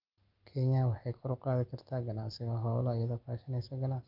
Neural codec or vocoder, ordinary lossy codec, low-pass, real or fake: none; Opus, 32 kbps; 5.4 kHz; real